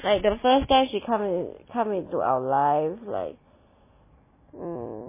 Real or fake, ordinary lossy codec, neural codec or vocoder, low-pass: real; MP3, 16 kbps; none; 3.6 kHz